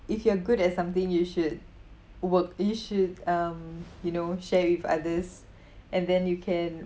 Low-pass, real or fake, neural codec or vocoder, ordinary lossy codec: none; real; none; none